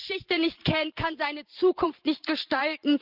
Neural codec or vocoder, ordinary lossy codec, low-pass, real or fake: vocoder, 44.1 kHz, 128 mel bands every 512 samples, BigVGAN v2; Opus, 32 kbps; 5.4 kHz; fake